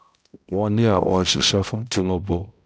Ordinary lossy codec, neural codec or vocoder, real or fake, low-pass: none; codec, 16 kHz, 0.5 kbps, X-Codec, HuBERT features, trained on balanced general audio; fake; none